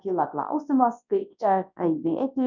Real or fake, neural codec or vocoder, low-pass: fake; codec, 24 kHz, 0.9 kbps, WavTokenizer, large speech release; 7.2 kHz